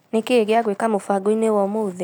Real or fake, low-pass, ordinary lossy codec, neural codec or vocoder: real; none; none; none